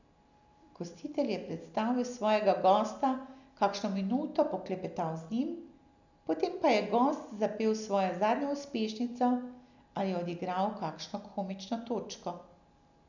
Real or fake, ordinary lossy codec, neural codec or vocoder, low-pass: real; none; none; 7.2 kHz